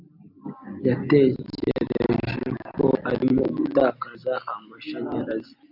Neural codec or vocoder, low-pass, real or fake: vocoder, 44.1 kHz, 128 mel bands every 256 samples, BigVGAN v2; 5.4 kHz; fake